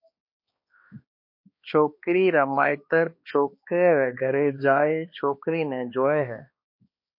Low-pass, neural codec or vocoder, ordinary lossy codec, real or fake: 5.4 kHz; codec, 16 kHz, 2 kbps, X-Codec, HuBERT features, trained on balanced general audio; MP3, 32 kbps; fake